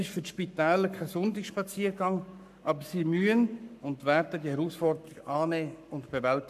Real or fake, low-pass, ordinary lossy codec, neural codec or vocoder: fake; 14.4 kHz; none; codec, 44.1 kHz, 7.8 kbps, Pupu-Codec